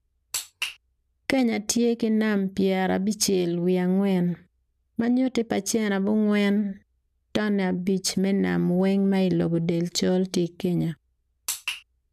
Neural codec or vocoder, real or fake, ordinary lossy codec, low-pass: none; real; none; 14.4 kHz